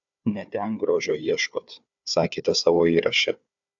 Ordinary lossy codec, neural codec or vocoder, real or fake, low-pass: AAC, 64 kbps; codec, 16 kHz, 4 kbps, FunCodec, trained on Chinese and English, 50 frames a second; fake; 7.2 kHz